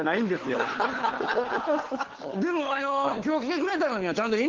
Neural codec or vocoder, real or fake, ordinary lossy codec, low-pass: codec, 16 kHz, 4 kbps, FunCodec, trained on LibriTTS, 50 frames a second; fake; Opus, 16 kbps; 7.2 kHz